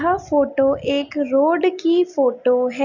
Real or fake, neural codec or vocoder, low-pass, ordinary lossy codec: real; none; 7.2 kHz; none